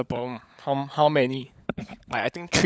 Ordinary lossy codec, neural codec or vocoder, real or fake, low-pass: none; codec, 16 kHz, 16 kbps, FunCodec, trained on LibriTTS, 50 frames a second; fake; none